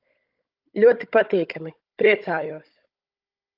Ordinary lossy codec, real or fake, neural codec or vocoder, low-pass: Opus, 24 kbps; fake; codec, 16 kHz, 16 kbps, FunCodec, trained on Chinese and English, 50 frames a second; 5.4 kHz